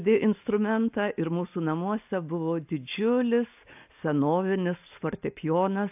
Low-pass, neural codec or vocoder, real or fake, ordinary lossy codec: 3.6 kHz; none; real; AAC, 32 kbps